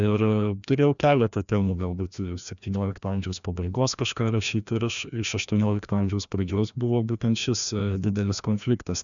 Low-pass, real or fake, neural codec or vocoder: 7.2 kHz; fake; codec, 16 kHz, 1 kbps, FreqCodec, larger model